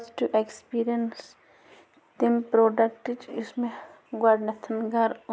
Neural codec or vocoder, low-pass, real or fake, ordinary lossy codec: none; none; real; none